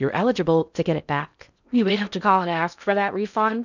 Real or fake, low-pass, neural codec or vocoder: fake; 7.2 kHz; codec, 16 kHz in and 24 kHz out, 0.6 kbps, FocalCodec, streaming, 2048 codes